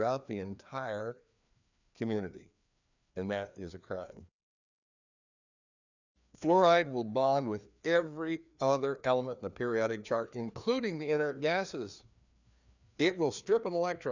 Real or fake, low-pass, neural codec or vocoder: fake; 7.2 kHz; codec, 16 kHz, 2 kbps, FreqCodec, larger model